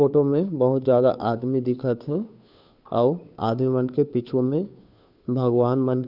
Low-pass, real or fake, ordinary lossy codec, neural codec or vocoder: 5.4 kHz; fake; none; codec, 16 kHz, 2 kbps, FunCodec, trained on Chinese and English, 25 frames a second